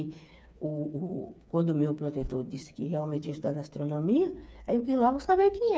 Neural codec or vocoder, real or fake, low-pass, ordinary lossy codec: codec, 16 kHz, 4 kbps, FreqCodec, smaller model; fake; none; none